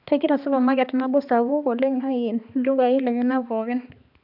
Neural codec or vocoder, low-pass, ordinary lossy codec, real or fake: codec, 16 kHz, 2 kbps, X-Codec, HuBERT features, trained on balanced general audio; 5.4 kHz; none; fake